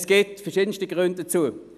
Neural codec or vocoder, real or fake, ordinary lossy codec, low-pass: none; real; none; 14.4 kHz